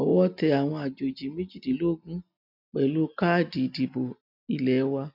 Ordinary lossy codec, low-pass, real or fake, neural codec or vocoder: none; 5.4 kHz; real; none